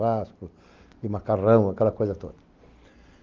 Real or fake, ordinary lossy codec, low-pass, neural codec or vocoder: real; Opus, 32 kbps; 7.2 kHz; none